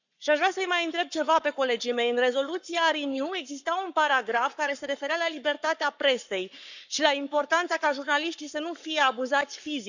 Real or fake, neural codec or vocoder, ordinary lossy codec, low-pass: fake; codec, 44.1 kHz, 7.8 kbps, Pupu-Codec; none; 7.2 kHz